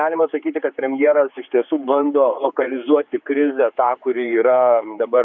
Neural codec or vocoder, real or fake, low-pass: codec, 16 kHz, 4 kbps, X-Codec, HuBERT features, trained on general audio; fake; 7.2 kHz